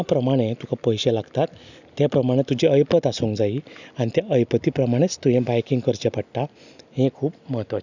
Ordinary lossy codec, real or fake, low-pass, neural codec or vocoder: none; real; 7.2 kHz; none